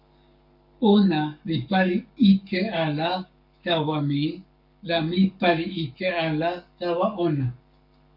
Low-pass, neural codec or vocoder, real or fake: 5.4 kHz; codec, 44.1 kHz, 7.8 kbps, Pupu-Codec; fake